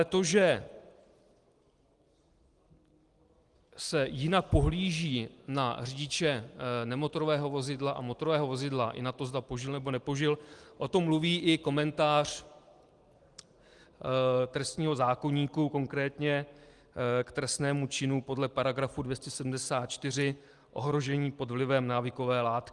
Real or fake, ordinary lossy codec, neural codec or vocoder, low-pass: real; Opus, 24 kbps; none; 10.8 kHz